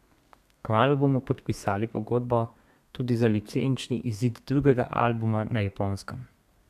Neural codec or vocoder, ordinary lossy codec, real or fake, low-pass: codec, 32 kHz, 1.9 kbps, SNAC; none; fake; 14.4 kHz